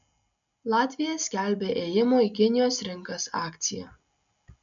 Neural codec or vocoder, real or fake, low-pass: none; real; 7.2 kHz